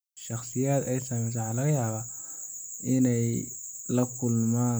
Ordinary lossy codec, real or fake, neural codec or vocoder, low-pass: none; real; none; none